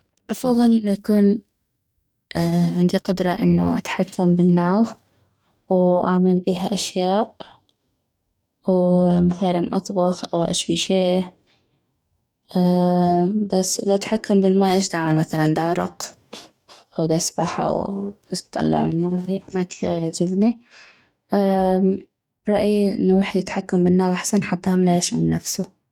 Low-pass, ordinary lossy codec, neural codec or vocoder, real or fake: 19.8 kHz; none; codec, 44.1 kHz, 2.6 kbps, DAC; fake